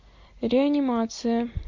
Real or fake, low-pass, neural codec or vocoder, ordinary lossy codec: real; 7.2 kHz; none; MP3, 48 kbps